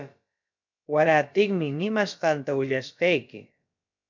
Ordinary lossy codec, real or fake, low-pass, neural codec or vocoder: MP3, 64 kbps; fake; 7.2 kHz; codec, 16 kHz, about 1 kbps, DyCAST, with the encoder's durations